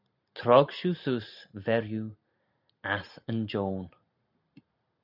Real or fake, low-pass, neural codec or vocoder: real; 5.4 kHz; none